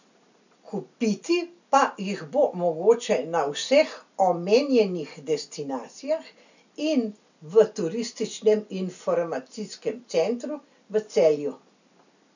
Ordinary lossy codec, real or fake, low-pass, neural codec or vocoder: none; real; 7.2 kHz; none